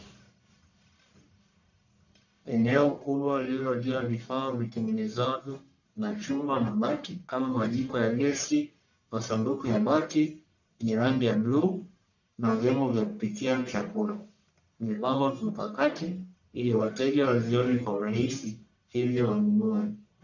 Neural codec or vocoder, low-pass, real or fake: codec, 44.1 kHz, 1.7 kbps, Pupu-Codec; 7.2 kHz; fake